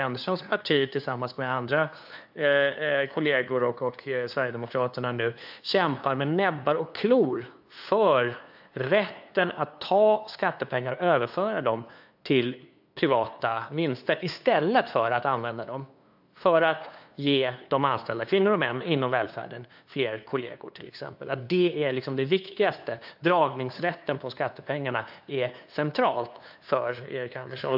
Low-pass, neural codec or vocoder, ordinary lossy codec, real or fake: 5.4 kHz; codec, 16 kHz, 2 kbps, FunCodec, trained on LibriTTS, 25 frames a second; AAC, 48 kbps; fake